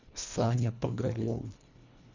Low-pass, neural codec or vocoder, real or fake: 7.2 kHz; codec, 24 kHz, 1.5 kbps, HILCodec; fake